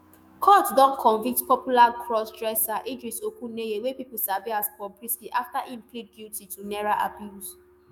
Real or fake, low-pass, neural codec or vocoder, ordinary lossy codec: fake; none; autoencoder, 48 kHz, 128 numbers a frame, DAC-VAE, trained on Japanese speech; none